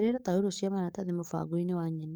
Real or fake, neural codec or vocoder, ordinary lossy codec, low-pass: fake; codec, 44.1 kHz, 7.8 kbps, DAC; none; none